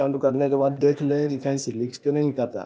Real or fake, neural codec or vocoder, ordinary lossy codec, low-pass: fake; codec, 16 kHz, 0.8 kbps, ZipCodec; none; none